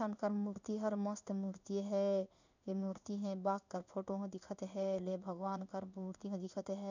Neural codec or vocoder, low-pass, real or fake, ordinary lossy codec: codec, 16 kHz in and 24 kHz out, 1 kbps, XY-Tokenizer; 7.2 kHz; fake; none